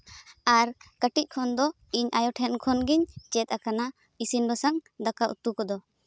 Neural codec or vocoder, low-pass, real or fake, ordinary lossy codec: none; none; real; none